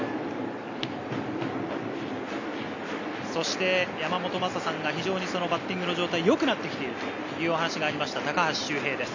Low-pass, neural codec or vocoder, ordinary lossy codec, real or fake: 7.2 kHz; none; none; real